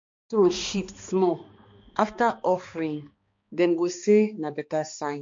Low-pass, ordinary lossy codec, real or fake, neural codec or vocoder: 7.2 kHz; MP3, 48 kbps; fake; codec, 16 kHz, 2 kbps, X-Codec, HuBERT features, trained on balanced general audio